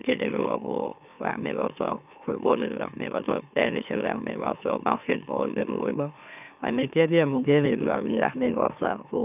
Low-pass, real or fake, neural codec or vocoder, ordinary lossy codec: 3.6 kHz; fake; autoencoder, 44.1 kHz, a latent of 192 numbers a frame, MeloTTS; none